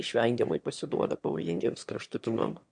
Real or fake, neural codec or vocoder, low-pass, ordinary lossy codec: fake; autoencoder, 22.05 kHz, a latent of 192 numbers a frame, VITS, trained on one speaker; 9.9 kHz; MP3, 64 kbps